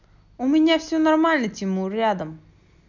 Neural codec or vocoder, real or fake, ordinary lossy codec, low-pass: none; real; none; 7.2 kHz